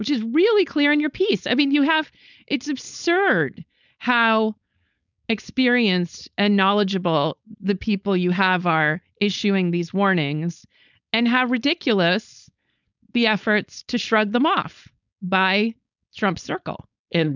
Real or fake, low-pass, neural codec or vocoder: fake; 7.2 kHz; codec, 16 kHz, 4.8 kbps, FACodec